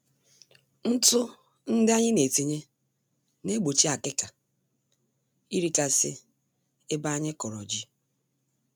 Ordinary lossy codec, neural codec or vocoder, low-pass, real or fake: none; none; none; real